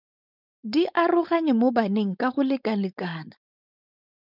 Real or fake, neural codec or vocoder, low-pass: fake; codec, 16 kHz, 4.8 kbps, FACodec; 5.4 kHz